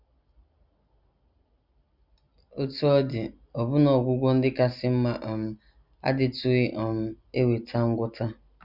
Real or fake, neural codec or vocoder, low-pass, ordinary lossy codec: real; none; 5.4 kHz; Opus, 64 kbps